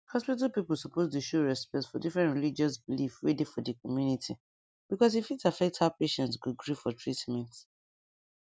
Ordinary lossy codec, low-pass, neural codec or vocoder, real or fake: none; none; none; real